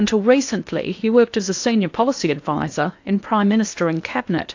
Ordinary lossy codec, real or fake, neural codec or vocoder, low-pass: AAC, 48 kbps; fake; codec, 16 kHz in and 24 kHz out, 0.8 kbps, FocalCodec, streaming, 65536 codes; 7.2 kHz